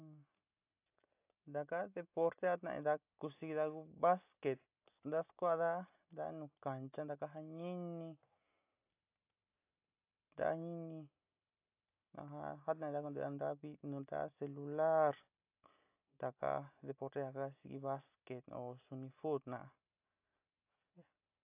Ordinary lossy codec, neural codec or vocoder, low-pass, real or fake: AAC, 32 kbps; none; 3.6 kHz; real